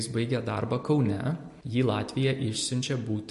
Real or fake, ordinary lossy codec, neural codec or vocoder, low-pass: real; MP3, 48 kbps; none; 14.4 kHz